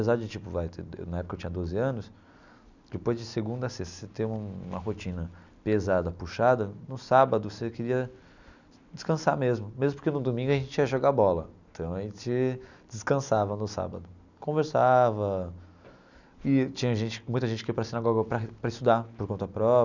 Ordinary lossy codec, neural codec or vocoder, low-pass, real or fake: none; none; 7.2 kHz; real